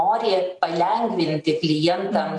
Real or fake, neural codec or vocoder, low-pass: fake; vocoder, 44.1 kHz, 128 mel bands every 512 samples, BigVGAN v2; 10.8 kHz